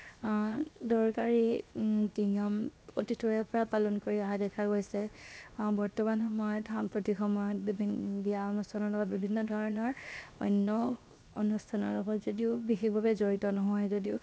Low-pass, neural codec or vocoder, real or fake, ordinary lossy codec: none; codec, 16 kHz, 0.7 kbps, FocalCodec; fake; none